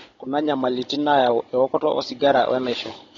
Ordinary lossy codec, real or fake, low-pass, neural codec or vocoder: AAC, 32 kbps; fake; 7.2 kHz; codec, 16 kHz, 16 kbps, FunCodec, trained on Chinese and English, 50 frames a second